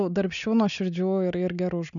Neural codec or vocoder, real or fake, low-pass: none; real; 7.2 kHz